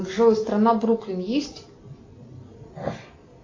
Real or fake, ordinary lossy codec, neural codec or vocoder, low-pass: real; AAC, 32 kbps; none; 7.2 kHz